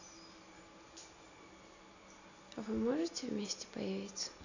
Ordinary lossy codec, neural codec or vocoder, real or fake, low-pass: none; none; real; 7.2 kHz